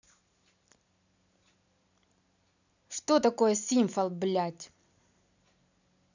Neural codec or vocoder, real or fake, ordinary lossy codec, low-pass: none; real; none; 7.2 kHz